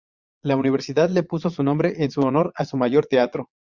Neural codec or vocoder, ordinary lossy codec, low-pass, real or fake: vocoder, 22.05 kHz, 80 mel bands, Vocos; Opus, 64 kbps; 7.2 kHz; fake